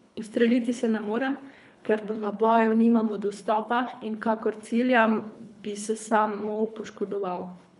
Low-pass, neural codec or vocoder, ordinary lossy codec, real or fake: 10.8 kHz; codec, 24 kHz, 3 kbps, HILCodec; none; fake